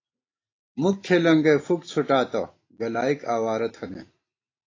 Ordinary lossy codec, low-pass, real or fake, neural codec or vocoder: AAC, 32 kbps; 7.2 kHz; real; none